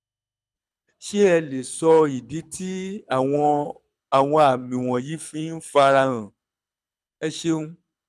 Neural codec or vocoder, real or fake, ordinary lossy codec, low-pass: codec, 24 kHz, 6 kbps, HILCodec; fake; none; none